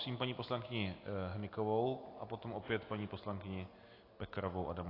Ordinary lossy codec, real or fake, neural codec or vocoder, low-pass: AAC, 32 kbps; real; none; 5.4 kHz